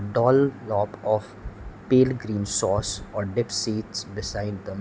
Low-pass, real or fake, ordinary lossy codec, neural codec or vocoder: none; real; none; none